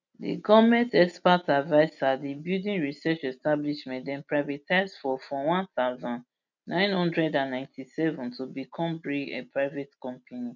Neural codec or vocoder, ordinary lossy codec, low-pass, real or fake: none; none; 7.2 kHz; real